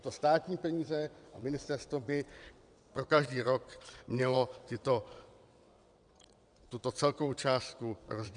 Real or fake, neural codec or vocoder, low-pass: fake; vocoder, 22.05 kHz, 80 mel bands, WaveNeXt; 9.9 kHz